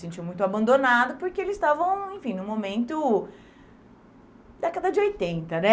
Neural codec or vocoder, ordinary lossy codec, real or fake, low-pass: none; none; real; none